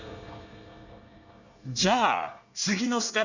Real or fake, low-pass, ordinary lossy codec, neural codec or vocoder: fake; 7.2 kHz; none; codec, 24 kHz, 1 kbps, SNAC